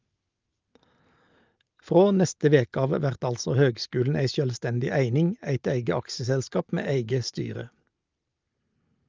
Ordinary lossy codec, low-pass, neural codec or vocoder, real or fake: Opus, 24 kbps; 7.2 kHz; none; real